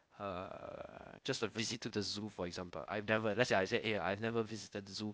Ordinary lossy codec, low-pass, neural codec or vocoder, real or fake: none; none; codec, 16 kHz, 0.8 kbps, ZipCodec; fake